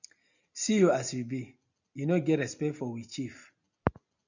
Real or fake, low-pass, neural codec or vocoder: real; 7.2 kHz; none